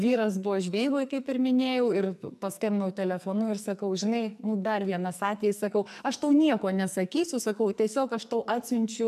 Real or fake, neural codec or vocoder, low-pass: fake; codec, 44.1 kHz, 2.6 kbps, SNAC; 14.4 kHz